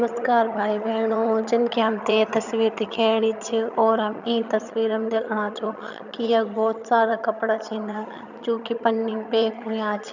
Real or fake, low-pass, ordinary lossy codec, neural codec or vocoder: fake; 7.2 kHz; none; vocoder, 22.05 kHz, 80 mel bands, HiFi-GAN